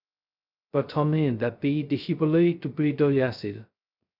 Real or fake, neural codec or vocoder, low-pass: fake; codec, 16 kHz, 0.2 kbps, FocalCodec; 5.4 kHz